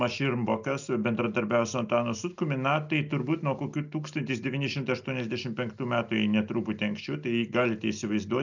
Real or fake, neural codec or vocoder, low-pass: real; none; 7.2 kHz